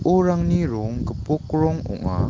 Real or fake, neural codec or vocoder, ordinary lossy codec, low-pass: real; none; Opus, 16 kbps; 7.2 kHz